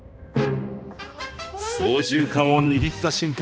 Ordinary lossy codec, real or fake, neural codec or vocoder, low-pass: none; fake; codec, 16 kHz, 1 kbps, X-Codec, HuBERT features, trained on balanced general audio; none